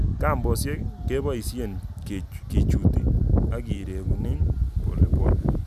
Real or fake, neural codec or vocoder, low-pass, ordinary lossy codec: real; none; 14.4 kHz; none